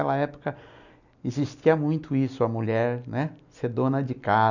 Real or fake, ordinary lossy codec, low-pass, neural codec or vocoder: real; none; 7.2 kHz; none